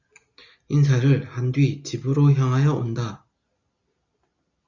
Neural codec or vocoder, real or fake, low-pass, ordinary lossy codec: none; real; 7.2 kHz; Opus, 64 kbps